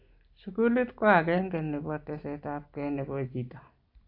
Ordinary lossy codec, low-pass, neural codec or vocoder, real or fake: AAC, 32 kbps; 5.4 kHz; none; real